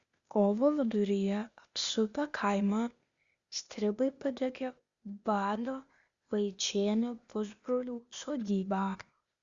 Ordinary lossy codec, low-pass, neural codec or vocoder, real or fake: Opus, 64 kbps; 7.2 kHz; codec, 16 kHz, 0.8 kbps, ZipCodec; fake